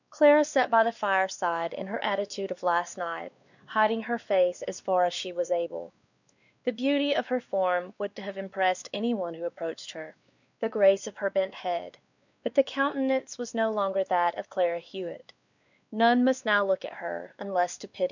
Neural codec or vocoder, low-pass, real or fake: codec, 16 kHz, 2 kbps, X-Codec, WavLM features, trained on Multilingual LibriSpeech; 7.2 kHz; fake